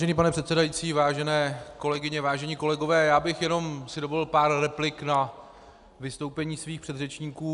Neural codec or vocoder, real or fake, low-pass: none; real; 10.8 kHz